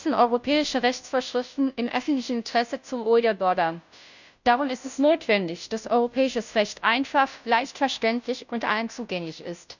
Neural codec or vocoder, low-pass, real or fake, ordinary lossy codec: codec, 16 kHz, 0.5 kbps, FunCodec, trained on Chinese and English, 25 frames a second; 7.2 kHz; fake; none